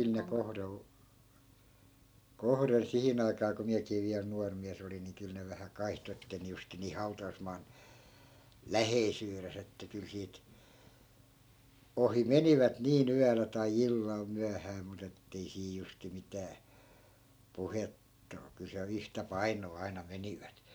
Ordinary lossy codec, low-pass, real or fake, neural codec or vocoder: none; none; real; none